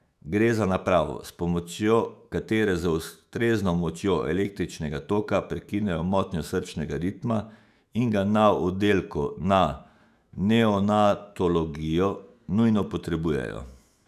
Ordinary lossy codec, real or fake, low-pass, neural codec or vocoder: none; fake; 14.4 kHz; autoencoder, 48 kHz, 128 numbers a frame, DAC-VAE, trained on Japanese speech